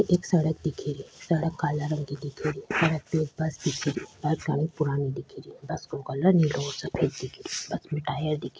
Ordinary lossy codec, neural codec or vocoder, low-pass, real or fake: none; none; none; real